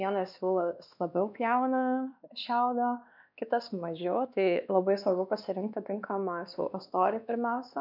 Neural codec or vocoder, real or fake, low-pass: codec, 16 kHz, 2 kbps, X-Codec, WavLM features, trained on Multilingual LibriSpeech; fake; 5.4 kHz